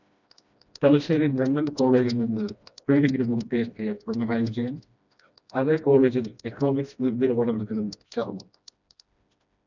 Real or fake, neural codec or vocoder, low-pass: fake; codec, 16 kHz, 1 kbps, FreqCodec, smaller model; 7.2 kHz